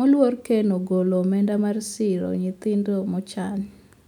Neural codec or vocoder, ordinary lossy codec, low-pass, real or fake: none; none; 19.8 kHz; real